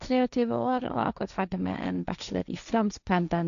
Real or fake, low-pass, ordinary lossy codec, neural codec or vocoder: fake; 7.2 kHz; none; codec, 16 kHz, 1.1 kbps, Voila-Tokenizer